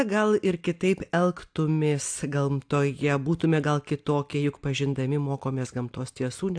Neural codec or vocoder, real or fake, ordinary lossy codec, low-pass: none; real; AAC, 64 kbps; 9.9 kHz